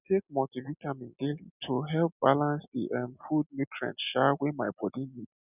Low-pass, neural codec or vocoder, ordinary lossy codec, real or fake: 3.6 kHz; none; none; real